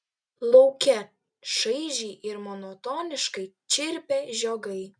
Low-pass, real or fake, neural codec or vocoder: 9.9 kHz; real; none